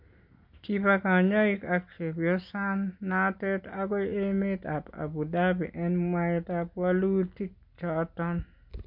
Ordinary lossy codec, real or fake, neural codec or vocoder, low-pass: MP3, 48 kbps; real; none; 5.4 kHz